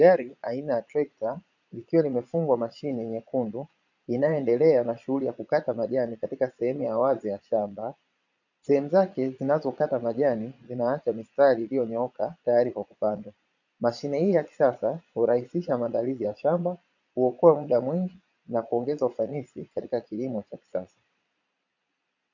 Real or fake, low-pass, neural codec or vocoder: fake; 7.2 kHz; vocoder, 22.05 kHz, 80 mel bands, Vocos